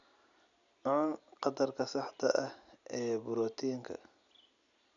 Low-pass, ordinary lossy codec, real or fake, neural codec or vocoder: 7.2 kHz; none; real; none